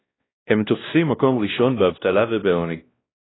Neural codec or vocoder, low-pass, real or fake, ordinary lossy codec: codec, 16 kHz, 1 kbps, X-Codec, WavLM features, trained on Multilingual LibriSpeech; 7.2 kHz; fake; AAC, 16 kbps